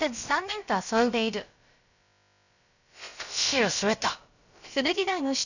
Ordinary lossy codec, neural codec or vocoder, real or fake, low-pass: none; codec, 16 kHz, about 1 kbps, DyCAST, with the encoder's durations; fake; 7.2 kHz